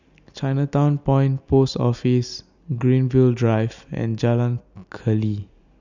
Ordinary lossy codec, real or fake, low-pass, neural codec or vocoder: none; real; 7.2 kHz; none